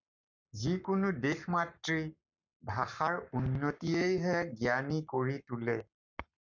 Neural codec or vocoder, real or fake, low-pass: codec, 44.1 kHz, 7.8 kbps, Pupu-Codec; fake; 7.2 kHz